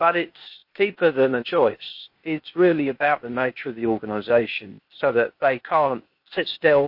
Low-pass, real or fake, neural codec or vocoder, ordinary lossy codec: 5.4 kHz; fake; codec, 16 kHz, 0.8 kbps, ZipCodec; MP3, 32 kbps